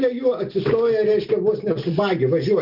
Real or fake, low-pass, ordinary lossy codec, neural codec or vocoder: real; 5.4 kHz; Opus, 24 kbps; none